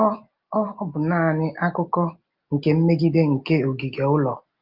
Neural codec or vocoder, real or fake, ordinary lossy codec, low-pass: none; real; Opus, 32 kbps; 5.4 kHz